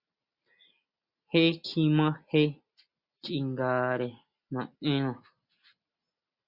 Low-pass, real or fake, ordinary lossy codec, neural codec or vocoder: 5.4 kHz; real; Opus, 64 kbps; none